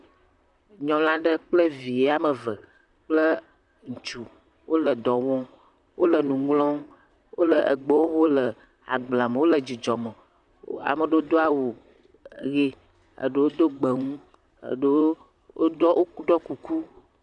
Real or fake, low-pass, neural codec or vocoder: fake; 10.8 kHz; vocoder, 44.1 kHz, 128 mel bands, Pupu-Vocoder